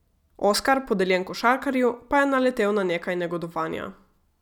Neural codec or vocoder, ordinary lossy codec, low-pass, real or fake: none; none; 19.8 kHz; real